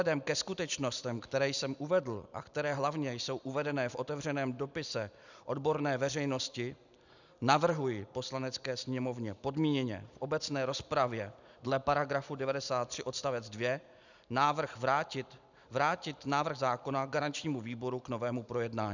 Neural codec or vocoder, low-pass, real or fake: none; 7.2 kHz; real